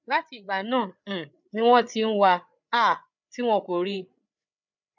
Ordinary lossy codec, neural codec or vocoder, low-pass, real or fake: none; codec, 16 kHz, 8 kbps, FreqCodec, larger model; 7.2 kHz; fake